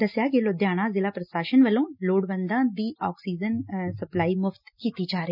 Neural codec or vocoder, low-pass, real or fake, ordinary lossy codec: none; 5.4 kHz; real; none